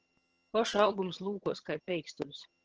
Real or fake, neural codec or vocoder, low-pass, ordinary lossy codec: fake; vocoder, 22.05 kHz, 80 mel bands, HiFi-GAN; 7.2 kHz; Opus, 16 kbps